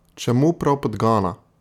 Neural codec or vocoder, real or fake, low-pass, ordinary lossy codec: none; real; 19.8 kHz; none